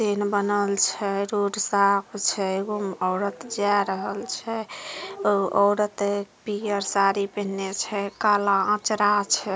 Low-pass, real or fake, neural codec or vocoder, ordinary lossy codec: none; real; none; none